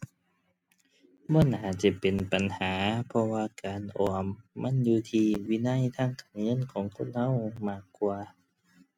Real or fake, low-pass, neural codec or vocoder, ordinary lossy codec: real; 19.8 kHz; none; MP3, 96 kbps